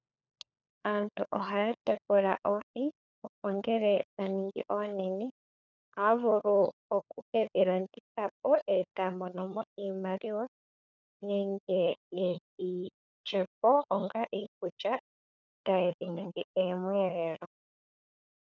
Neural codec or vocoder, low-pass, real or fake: codec, 16 kHz, 4 kbps, FunCodec, trained on LibriTTS, 50 frames a second; 7.2 kHz; fake